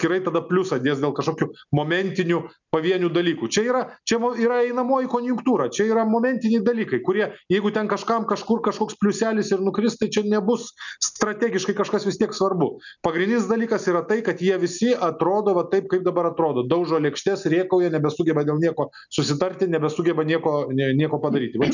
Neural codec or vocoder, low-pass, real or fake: none; 7.2 kHz; real